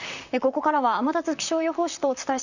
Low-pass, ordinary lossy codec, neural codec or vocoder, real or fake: 7.2 kHz; none; vocoder, 44.1 kHz, 128 mel bands, Pupu-Vocoder; fake